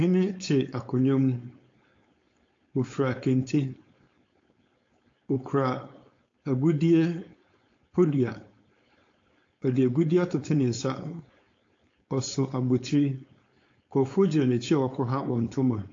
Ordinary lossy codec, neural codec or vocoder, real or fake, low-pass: AAC, 48 kbps; codec, 16 kHz, 4.8 kbps, FACodec; fake; 7.2 kHz